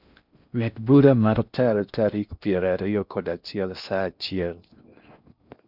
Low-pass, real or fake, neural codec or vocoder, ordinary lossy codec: 5.4 kHz; fake; codec, 16 kHz in and 24 kHz out, 0.6 kbps, FocalCodec, streaming, 4096 codes; none